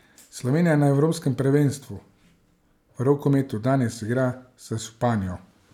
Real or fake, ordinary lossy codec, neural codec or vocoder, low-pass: real; none; none; 19.8 kHz